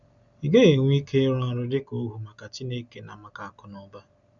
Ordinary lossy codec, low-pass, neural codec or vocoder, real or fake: none; 7.2 kHz; none; real